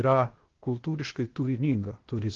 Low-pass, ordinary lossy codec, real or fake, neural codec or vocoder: 7.2 kHz; Opus, 16 kbps; fake; codec, 16 kHz, 0.8 kbps, ZipCodec